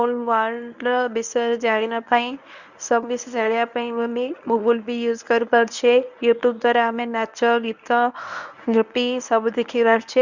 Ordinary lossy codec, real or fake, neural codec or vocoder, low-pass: none; fake; codec, 24 kHz, 0.9 kbps, WavTokenizer, medium speech release version 1; 7.2 kHz